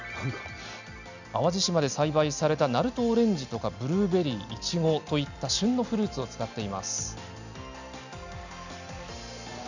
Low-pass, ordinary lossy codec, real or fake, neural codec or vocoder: 7.2 kHz; none; real; none